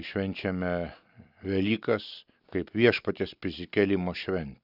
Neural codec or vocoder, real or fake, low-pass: none; real; 5.4 kHz